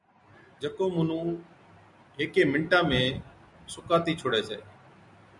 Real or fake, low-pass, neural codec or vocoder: real; 10.8 kHz; none